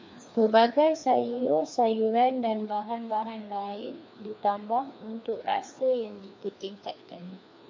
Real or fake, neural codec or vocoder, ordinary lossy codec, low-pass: fake; codec, 16 kHz, 2 kbps, FreqCodec, larger model; MP3, 64 kbps; 7.2 kHz